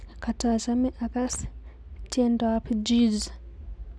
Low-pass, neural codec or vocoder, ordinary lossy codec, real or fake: none; none; none; real